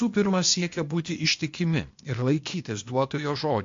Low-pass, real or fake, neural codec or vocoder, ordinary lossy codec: 7.2 kHz; fake; codec, 16 kHz, 0.8 kbps, ZipCodec; MP3, 48 kbps